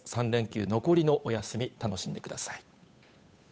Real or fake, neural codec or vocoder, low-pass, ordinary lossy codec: fake; codec, 16 kHz, 8 kbps, FunCodec, trained on Chinese and English, 25 frames a second; none; none